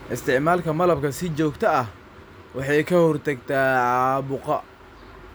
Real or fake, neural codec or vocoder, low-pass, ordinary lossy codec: real; none; none; none